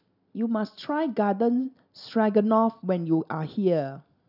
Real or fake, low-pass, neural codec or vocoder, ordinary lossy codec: real; 5.4 kHz; none; none